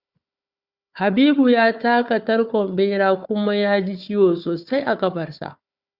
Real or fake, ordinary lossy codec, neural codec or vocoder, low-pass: fake; Opus, 64 kbps; codec, 16 kHz, 4 kbps, FunCodec, trained on Chinese and English, 50 frames a second; 5.4 kHz